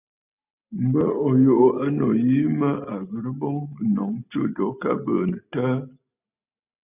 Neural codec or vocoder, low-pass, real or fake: none; 3.6 kHz; real